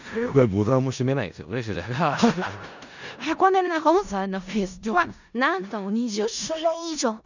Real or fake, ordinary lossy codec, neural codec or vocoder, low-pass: fake; none; codec, 16 kHz in and 24 kHz out, 0.4 kbps, LongCat-Audio-Codec, four codebook decoder; 7.2 kHz